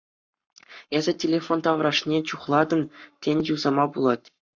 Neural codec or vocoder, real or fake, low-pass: codec, 44.1 kHz, 7.8 kbps, Pupu-Codec; fake; 7.2 kHz